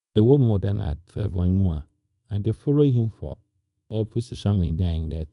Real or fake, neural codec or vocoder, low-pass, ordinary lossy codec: fake; codec, 24 kHz, 0.9 kbps, WavTokenizer, small release; 10.8 kHz; none